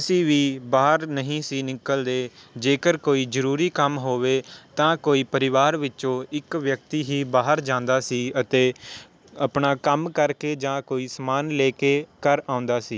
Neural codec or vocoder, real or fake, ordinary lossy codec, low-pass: none; real; none; none